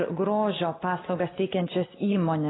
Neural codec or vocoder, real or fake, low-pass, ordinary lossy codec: none; real; 7.2 kHz; AAC, 16 kbps